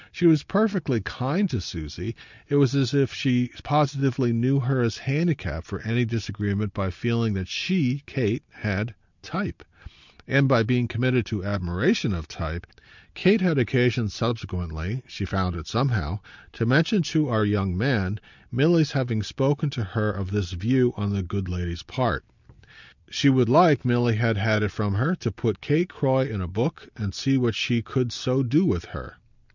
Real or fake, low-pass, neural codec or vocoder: real; 7.2 kHz; none